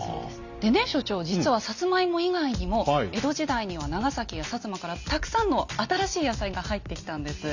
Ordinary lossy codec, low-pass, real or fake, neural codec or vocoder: none; 7.2 kHz; real; none